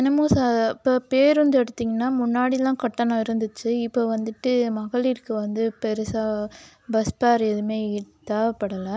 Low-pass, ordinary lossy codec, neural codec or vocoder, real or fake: none; none; none; real